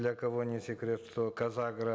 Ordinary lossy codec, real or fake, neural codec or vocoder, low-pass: none; real; none; none